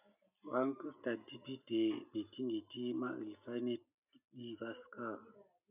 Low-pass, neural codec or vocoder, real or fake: 3.6 kHz; none; real